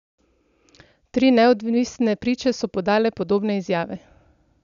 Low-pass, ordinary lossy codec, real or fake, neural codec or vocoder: 7.2 kHz; none; real; none